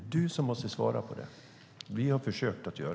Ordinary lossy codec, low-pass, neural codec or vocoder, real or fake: none; none; none; real